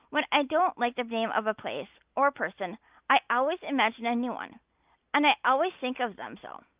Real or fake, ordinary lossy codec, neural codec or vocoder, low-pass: real; Opus, 24 kbps; none; 3.6 kHz